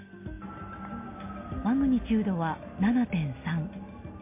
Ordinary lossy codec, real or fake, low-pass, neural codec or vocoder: MP3, 24 kbps; real; 3.6 kHz; none